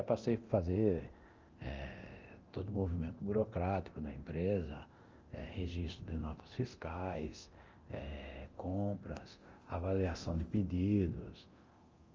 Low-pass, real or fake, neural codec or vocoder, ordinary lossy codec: 7.2 kHz; fake; codec, 24 kHz, 0.9 kbps, DualCodec; Opus, 32 kbps